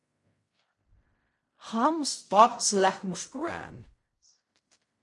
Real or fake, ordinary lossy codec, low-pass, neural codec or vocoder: fake; MP3, 48 kbps; 10.8 kHz; codec, 16 kHz in and 24 kHz out, 0.4 kbps, LongCat-Audio-Codec, fine tuned four codebook decoder